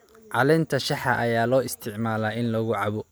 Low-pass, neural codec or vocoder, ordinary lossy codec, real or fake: none; none; none; real